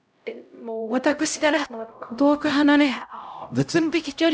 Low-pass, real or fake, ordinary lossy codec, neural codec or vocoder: none; fake; none; codec, 16 kHz, 0.5 kbps, X-Codec, HuBERT features, trained on LibriSpeech